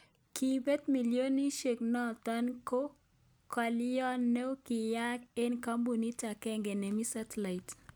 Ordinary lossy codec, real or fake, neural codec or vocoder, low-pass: none; real; none; none